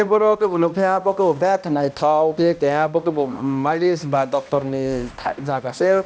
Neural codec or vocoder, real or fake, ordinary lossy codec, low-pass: codec, 16 kHz, 1 kbps, X-Codec, HuBERT features, trained on balanced general audio; fake; none; none